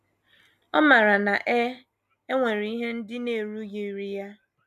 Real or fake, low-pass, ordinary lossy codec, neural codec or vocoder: real; 14.4 kHz; none; none